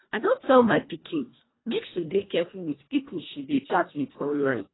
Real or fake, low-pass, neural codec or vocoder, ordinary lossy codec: fake; 7.2 kHz; codec, 24 kHz, 1.5 kbps, HILCodec; AAC, 16 kbps